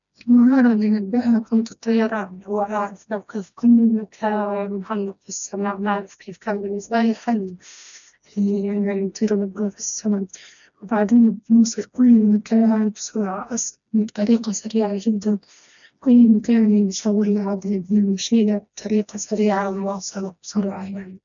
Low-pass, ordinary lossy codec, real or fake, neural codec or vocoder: 7.2 kHz; none; fake; codec, 16 kHz, 1 kbps, FreqCodec, smaller model